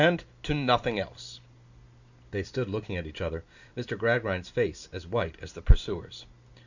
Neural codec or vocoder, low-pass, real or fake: none; 7.2 kHz; real